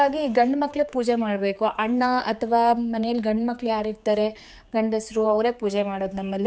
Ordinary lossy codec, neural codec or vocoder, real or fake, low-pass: none; codec, 16 kHz, 4 kbps, X-Codec, HuBERT features, trained on general audio; fake; none